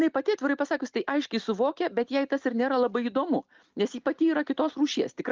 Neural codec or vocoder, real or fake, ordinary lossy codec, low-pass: none; real; Opus, 32 kbps; 7.2 kHz